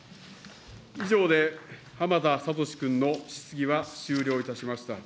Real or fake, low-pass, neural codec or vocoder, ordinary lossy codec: real; none; none; none